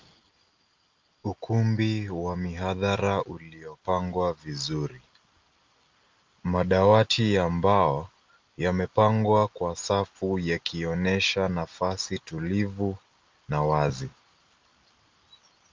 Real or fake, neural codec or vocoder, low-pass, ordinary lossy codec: real; none; 7.2 kHz; Opus, 24 kbps